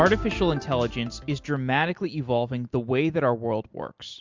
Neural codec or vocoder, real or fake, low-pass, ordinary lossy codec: none; real; 7.2 kHz; MP3, 64 kbps